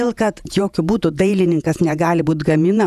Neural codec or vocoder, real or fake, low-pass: vocoder, 48 kHz, 128 mel bands, Vocos; fake; 14.4 kHz